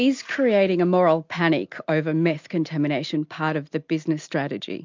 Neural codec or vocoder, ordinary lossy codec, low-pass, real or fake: none; MP3, 64 kbps; 7.2 kHz; real